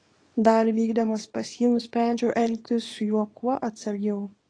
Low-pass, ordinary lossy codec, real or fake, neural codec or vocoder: 9.9 kHz; AAC, 32 kbps; fake; codec, 24 kHz, 0.9 kbps, WavTokenizer, small release